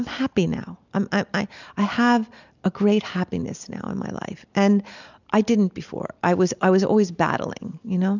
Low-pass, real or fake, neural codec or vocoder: 7.2 kHz; real; none